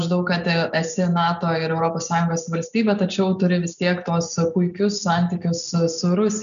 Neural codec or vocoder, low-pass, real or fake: none; 7.2 kHz; real